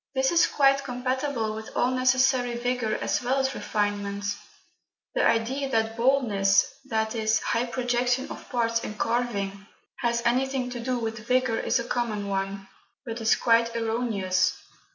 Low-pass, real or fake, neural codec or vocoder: 7.2 kHz; real; none